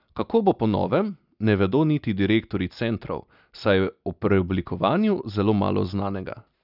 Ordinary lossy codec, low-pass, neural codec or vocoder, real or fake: none; 5.4 kHz; none; real